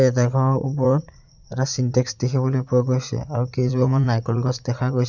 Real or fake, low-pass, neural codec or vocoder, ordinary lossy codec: fake; 7.2 kHz; vocoder, 44.1 kHz, 80 mel bands, Vocos; none